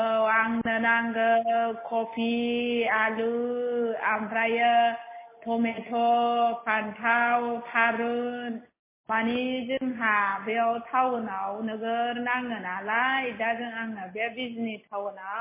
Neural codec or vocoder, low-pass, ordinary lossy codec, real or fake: none; 3.6 kHz; MP3, 16 kbps; real